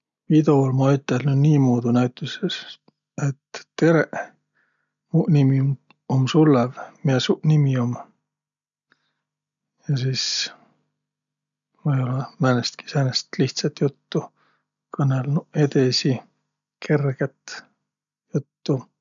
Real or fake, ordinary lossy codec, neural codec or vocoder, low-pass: real; none; none; 7.2 kHz